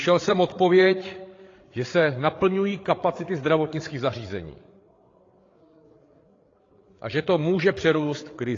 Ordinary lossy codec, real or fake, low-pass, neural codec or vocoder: AAC, 32 kbps; fake; 7.2 kHz; codec, 16 kHz, 8 kbps, FreqCodec, larger model